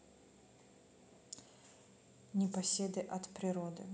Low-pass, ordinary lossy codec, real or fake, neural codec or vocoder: none; none; real; none